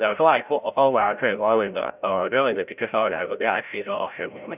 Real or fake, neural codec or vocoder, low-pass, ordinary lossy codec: fake; codec, 16 kHz, 0.5 kbps, FreqCodec, larger model; 3.6 kHz; none